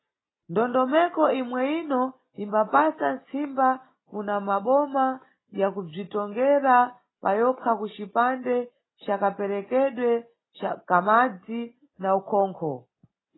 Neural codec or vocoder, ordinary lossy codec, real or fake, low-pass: none; AAC, 16 kbps; real; 7.2 kHz